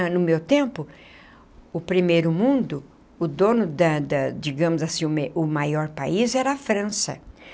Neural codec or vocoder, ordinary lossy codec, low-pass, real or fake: none; none; none; real